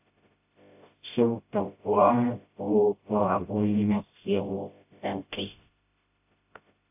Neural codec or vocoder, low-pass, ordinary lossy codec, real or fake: codec, 16 kHz, 0.5 kbps, FreqCodec, smaller model; 3.6 kHz; AAC, 32 kbps; fake